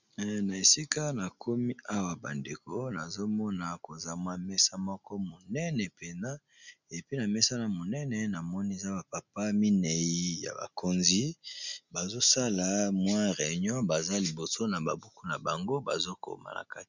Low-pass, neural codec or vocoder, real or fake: 7.2 kHz; none; real